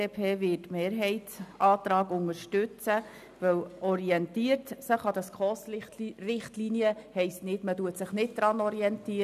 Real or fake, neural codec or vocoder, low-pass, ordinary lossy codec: real; none; 14.4 kHz; none